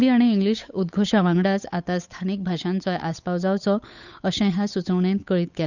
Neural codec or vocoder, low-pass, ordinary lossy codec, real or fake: codec, 16 kHz, 8 kbps, FunCodec, trained on Chinese and English, 25 frames a second; 7.2 kHz; none; fake